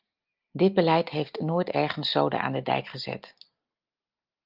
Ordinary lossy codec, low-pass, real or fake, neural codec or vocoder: Opus, 24 kbps; 5.4 kHz; real; none